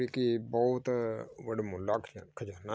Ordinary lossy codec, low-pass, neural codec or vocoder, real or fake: none; none; none; real